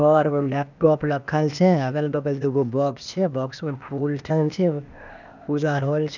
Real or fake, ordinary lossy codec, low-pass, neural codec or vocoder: fake; none; 7.2 kHz; codec, 16 kHz, 0.8 kbps, ZipCodec